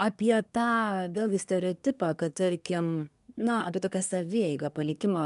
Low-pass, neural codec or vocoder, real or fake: 10.8 kHz; codec, 24 kHz, 1 kbps, SNAC; fake